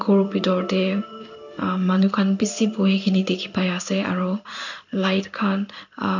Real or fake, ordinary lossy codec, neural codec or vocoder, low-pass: fake; none; codec, 16 kHz in and 24 kHz out, 1 kbps, XY-Tokenizer; 7.2 kHz